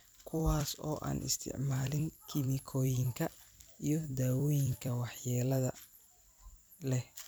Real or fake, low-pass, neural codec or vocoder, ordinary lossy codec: fake; none; vocoder, 44.1 kHz, 128 mel bands every 256 samples, BigVGAN v2; none